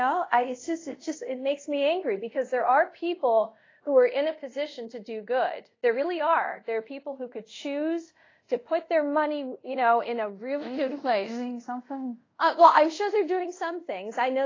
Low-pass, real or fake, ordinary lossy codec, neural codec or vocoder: 7.2 kHz; fake; AAC, 32 kbps; codec, 24 kHz, 0.5 kbps, DualCodec